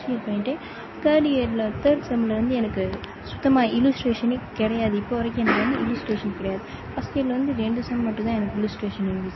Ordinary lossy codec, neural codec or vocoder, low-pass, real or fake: MP3, 24 kbps; none; 7.2 kHz; real